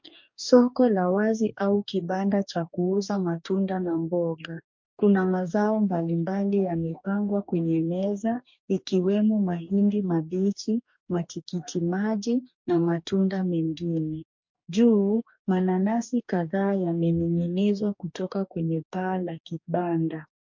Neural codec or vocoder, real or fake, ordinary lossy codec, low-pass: codec, 44.1 kHz, 2.6 kbps, DAC; fake; MP3, 48 kbps; 7.2 kHz